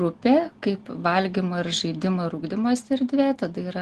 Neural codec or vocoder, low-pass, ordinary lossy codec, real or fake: none; 10.8 kHz; Opus, 16 kbps; real